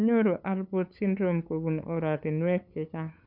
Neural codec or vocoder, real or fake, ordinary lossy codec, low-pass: codec, 16 kHz, 8 kbps, FunCodec, trained on LibriTTS, 25 frames a second; fake; none; 5.4 kHz